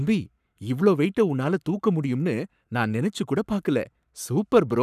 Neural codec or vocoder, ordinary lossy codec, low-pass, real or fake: codec, 44.1 kHz, 7.8 kbps, Pupu-Codec; none; 14.4 kHz; fake